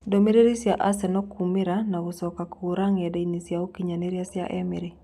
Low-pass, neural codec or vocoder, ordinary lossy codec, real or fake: 14.4 kHz; none; none; real